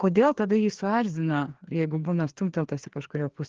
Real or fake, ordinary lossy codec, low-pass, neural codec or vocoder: fake; Opus, 16 kbps; 7.2 kHz; codec, 16 kHz, 2 kbps, FreqCodec, larger model